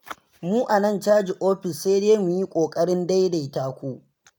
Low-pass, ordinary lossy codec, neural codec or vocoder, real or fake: none; none; none; real